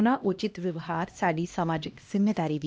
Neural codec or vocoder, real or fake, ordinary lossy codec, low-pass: codec, 16 kHz, 1 kbps, X-Codec, HuBERT features, trained on LibriSpeech; fake; none; none